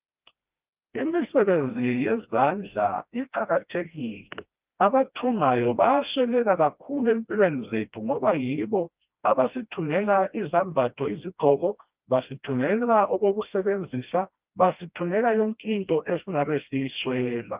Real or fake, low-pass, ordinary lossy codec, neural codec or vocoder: fake; 3.6 kHz; Opus, 24 kbps; codec, 16 kHz, 1 kbps, FreqCodec, smaller model